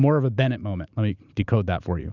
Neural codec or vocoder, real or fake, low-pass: none; real; 7.2 kHz